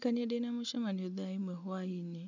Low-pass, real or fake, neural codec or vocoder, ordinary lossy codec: 7.2 kHz; real; none; none